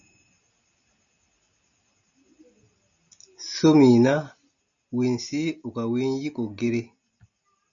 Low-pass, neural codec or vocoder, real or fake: 7.2 kHz; none; real